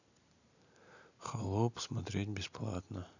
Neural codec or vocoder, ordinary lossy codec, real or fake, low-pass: none; none; real; 7.2 kHz